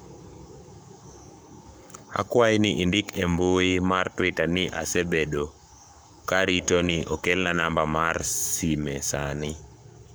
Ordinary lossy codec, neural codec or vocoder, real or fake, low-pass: none; codec, 44.1 kHz, 7.8 kbps, Pupu-Codec; fake; none